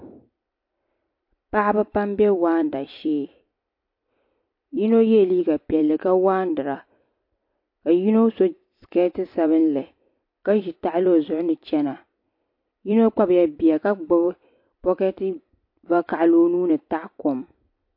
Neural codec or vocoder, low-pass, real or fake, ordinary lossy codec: vocoder, 44.1 kHz, 128 mel bands every 256 samples, BigVGAN v2; 5.4 kHz; fake; MP3, 32 kbps